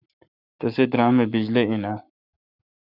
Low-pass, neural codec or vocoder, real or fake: 5.4 kHz; codec, 44.1 kHz, 7.8 kbps, Pupu-Codec; fake